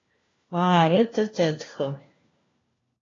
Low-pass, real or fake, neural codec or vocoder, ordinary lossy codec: 7.2 kHz; fake; codec, 16 kHz, 1 kbps, FunCodec, trained on LibriTTS, 50 frames a second; AAC, 32 kbps